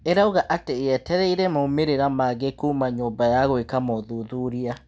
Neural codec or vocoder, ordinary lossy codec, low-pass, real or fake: none; none; none; real